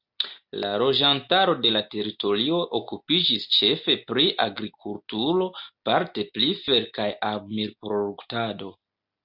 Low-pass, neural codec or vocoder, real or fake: 5.4 kHz; none; real